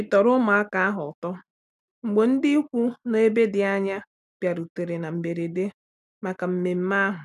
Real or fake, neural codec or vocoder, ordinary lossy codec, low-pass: real; none; none; 14.4 kHz